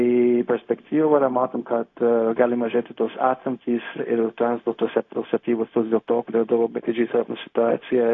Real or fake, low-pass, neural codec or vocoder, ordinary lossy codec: fake; 7.2 kHz; codec, 16 kHz, 0.4 kbps, LongCat-Audio-Codec; AAC, 48 kbps